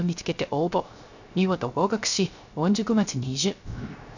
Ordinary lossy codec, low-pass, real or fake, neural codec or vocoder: none; 7.2 kHz; fake; codec, 16 kHz, 0.3 kbps, FocalCodec